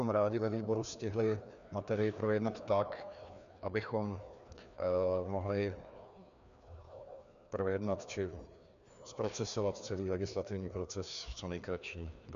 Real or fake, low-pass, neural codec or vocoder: fake; 7.2 kHz; codec, 16 kHz, 2 kbps, FreqCodec, larger model